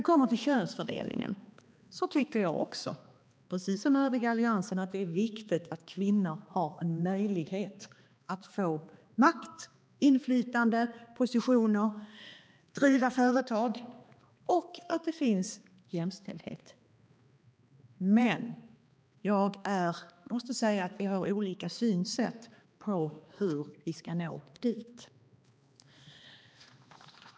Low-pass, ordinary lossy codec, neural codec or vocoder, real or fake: none; none; codec, 16 kHz, 2 kbps, X-Codec, HuBERT features, trained on balanced general audio; fake